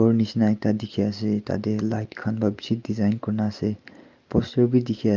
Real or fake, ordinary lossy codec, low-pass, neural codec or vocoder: real; Opus, 24 kbps; 7.2 kHz; none